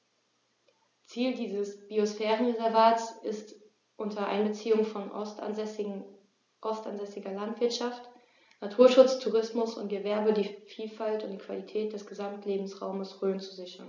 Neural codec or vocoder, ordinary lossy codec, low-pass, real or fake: none; none; 7.2 kHz; real